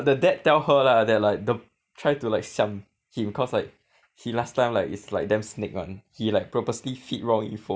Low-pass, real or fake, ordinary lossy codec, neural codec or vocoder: none; real; none; none